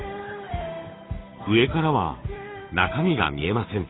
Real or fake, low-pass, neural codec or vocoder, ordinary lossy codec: fake; 7.2 kHz; codec, 16 kHz, 8 kbps, FunCodec, trained on Chinese and English, 25 frames a second; AAC, 16 kbps